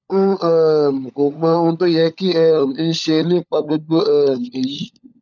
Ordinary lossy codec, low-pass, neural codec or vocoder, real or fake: none; 7.2 kHz; codec, 16 kHz, 16 kbps, FunCodec, trained on LibriTTS, 50 frames a second; fake